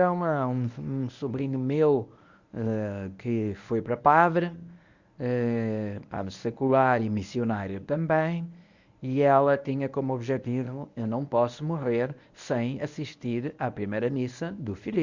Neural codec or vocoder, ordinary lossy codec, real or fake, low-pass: codec, 24 kHz, 0.9 kbps, WavTokenizer, medium speech release version 1; Opus, 64 kbps; fake; 7.2 kHz